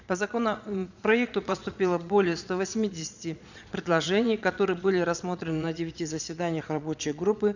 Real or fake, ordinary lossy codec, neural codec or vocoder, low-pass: fake; none; vocoder, 22.05 kHz, 80 mel bands, Vocos; 7.2 kHz